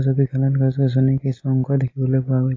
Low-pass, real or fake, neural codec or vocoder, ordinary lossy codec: 7.2 kHz; real; none; AAC, 32 kbps